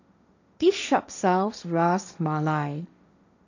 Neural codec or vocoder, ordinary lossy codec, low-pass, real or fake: codec, 16 kHz, 1.1 kbps, Voila-Tokenizer; none; 7.2 kHz; fake